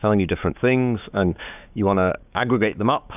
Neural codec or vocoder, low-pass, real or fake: codec, 16 kHz, 6 kbps, DAC; 3.6 kHz; fake